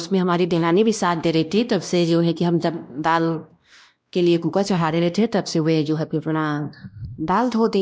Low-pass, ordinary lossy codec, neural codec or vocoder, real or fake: none; none; codec, 16 kHz, 1 kbps, X-Codec, WavLM features, trained on Multilingual LibriSpeech; fake